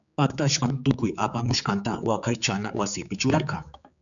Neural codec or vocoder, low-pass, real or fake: codec, 16 kHz, 4 kbps, X-Codec, HuBERT features, trained on general audio; 7.2 kHz; fake